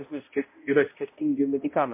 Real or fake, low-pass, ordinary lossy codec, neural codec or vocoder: fake; 3.6 kHz; MP3, 24 kbps; codec, 16 kHz, 0.5 kbps, X-Codec, HuBERT features, trained on balanced general audio